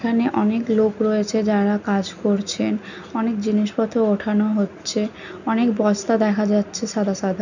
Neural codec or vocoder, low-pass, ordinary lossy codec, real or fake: none; 7.2 kHz; none; real